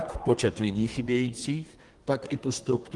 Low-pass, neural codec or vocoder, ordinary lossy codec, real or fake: 10.8 kHz; codec, 24 kHz, 1 kbps, SNAC; Opus, 24 kbps; fake